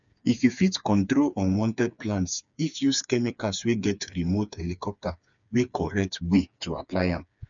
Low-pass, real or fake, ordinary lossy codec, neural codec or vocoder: 7.2 kHz; fake; none; codec, 16 kHz, 4 kbps, FreqCodec, smaller model